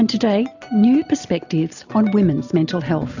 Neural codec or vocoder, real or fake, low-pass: none; real; 7.2 kHz